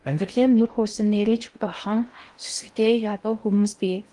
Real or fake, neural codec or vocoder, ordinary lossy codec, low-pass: fake; codec, 16 kHz in and 24 kHz out, 0.6 kbps, FocalCodec, streaming, 2048 codes; Opus, 24 kbps; 10.8 kHz